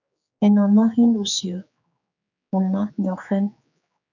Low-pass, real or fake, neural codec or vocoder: 7.2 kHz; fake; codec, 16 kHz, 4 kbps, X-Codec, HuBERT features, trained on general audio